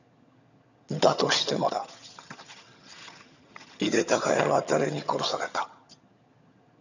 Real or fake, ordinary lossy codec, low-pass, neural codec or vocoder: fake; none; 7.2 kHz; vocoder, 22.05 kHz, 80 mel bands, HiFi-GAN